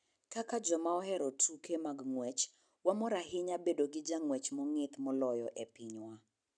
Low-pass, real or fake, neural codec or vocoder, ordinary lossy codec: 9.9 kHz; real; none; none